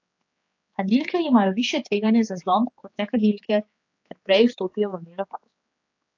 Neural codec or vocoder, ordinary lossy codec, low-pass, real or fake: codec, 16 kHz, 4 kbps, X-Codec, HuBERT features, trained on general audio; AAC, 48 kbps; 7.2 kHz; fake